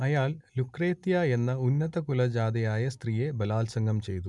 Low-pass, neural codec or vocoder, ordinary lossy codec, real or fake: 10.8 kHz; none; MP3, 96 kbps; real